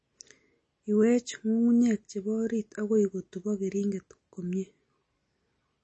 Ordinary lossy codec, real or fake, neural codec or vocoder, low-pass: MP3, 32 kbps; real; none; 10.8 kHz